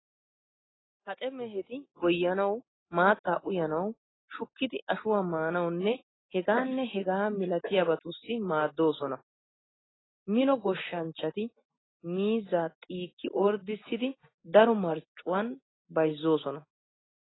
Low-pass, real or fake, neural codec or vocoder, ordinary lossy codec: 7.2 kHz; real; none; AAC, 16 kbps